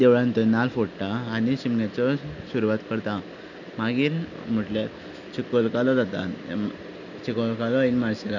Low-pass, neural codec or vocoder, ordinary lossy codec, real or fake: 7.2 kHz; none; none; real